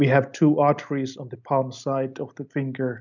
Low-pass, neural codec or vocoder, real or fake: 7.2 kHz; none; real